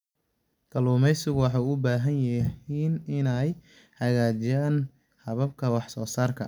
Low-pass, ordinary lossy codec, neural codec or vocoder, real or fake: 19.8 kHz; none; none; real